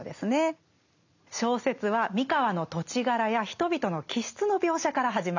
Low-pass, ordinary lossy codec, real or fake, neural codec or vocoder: 7.2 kHz; none; real; none